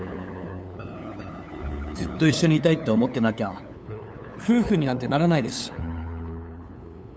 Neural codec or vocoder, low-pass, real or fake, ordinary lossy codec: codec, 16 kHz, 8 kbps, FunCodec, trained on LibriTTS, 25 frames a second; none; fake; none